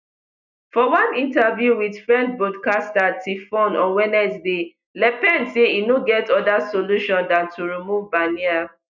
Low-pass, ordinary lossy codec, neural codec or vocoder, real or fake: 7.2 kHz; none; none; real